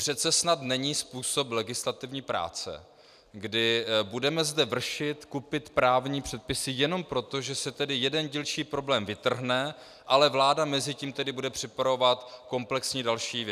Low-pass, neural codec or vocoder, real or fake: 14.4 kHz; none; real